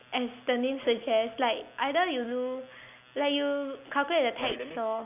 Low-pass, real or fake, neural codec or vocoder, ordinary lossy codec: 3.6 kHz; real; none; none